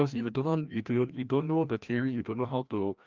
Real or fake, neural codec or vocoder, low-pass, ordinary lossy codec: fake; codec, 16 kHz, 1 kbps, FreqCodec, larger model; 7.2 kHz; Opus, 24 kbps